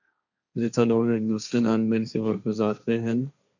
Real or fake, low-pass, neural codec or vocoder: fake; 7.2 kHz; codec, 16 kHz, 1.1 kbps, Voila-Tokenizer